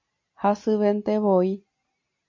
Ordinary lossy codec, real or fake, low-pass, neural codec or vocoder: MP3, 32 kbps; real; 7.2 kHz; none